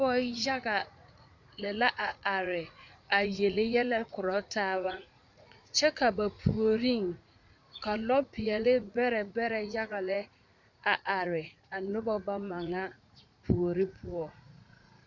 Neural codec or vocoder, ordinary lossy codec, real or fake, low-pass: vocoder, 44.1 kHz, 80 mel bands, Vocos; MP3, 64 kbps; fake; 7.2 kHz